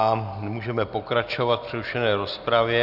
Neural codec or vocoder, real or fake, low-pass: none; real; 5.4 kHz